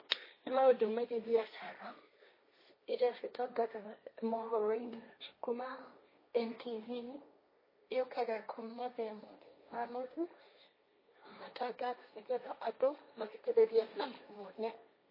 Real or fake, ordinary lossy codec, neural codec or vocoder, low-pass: fake; MP3, 24 kbps; codec, 16 kHz, 1.1 kbps, Voila-Tokenizer; 5.4 kHz